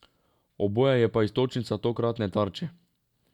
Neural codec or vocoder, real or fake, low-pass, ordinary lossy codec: none; real; 19.8 kHz; none